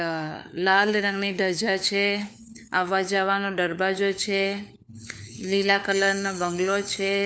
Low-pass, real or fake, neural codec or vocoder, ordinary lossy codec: none; fake; codec, 16 kHz, 4 kbps, FunCodec, trained on LibriTTS, 50 frames a second; none